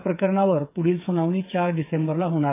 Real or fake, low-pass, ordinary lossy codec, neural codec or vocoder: fake; 3.6 kHz; AAC, 24 kbps; codec, 16 kHz, 8 kbps, FreqCodec, smaller model